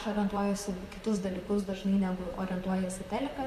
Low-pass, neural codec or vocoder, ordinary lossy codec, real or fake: 14.4 kHz; vocoder, 44.1 kHz, 128 mel bands, Pupu-Vocoder; AAC, 96 kbps; fake